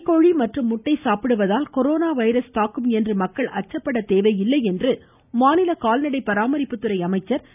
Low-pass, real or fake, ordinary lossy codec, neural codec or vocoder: 3.6 kHz; real; none; none